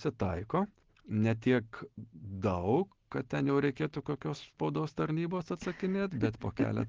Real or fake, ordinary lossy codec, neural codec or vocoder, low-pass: real; Opus, 16 kbps; none; 7.2 kHz